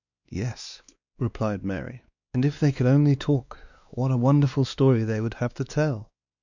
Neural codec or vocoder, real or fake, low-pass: codec, 16 kHz, 2 kbps, X-Codec, WavLM features, trained on Multilingual LibriSpeech; fake; 7.2 kHz